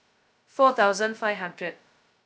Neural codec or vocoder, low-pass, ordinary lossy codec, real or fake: codec, 16 kHz, 0.2 kbps, FocalCodec; none; none; fake